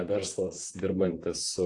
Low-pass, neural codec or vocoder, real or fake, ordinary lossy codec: 10.8 kHz; vocoder, 44.1 kHz, 128 mel bands, Pupu-Vocoder; fake; AAC, 48 kbps